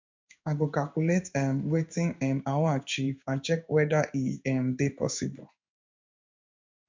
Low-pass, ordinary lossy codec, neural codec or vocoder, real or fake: 7.2 kHz; MP3, 64 kbps; codec, 16 kHz in and 24 kHz out, 1 kbps, XY-Tokenizer; fake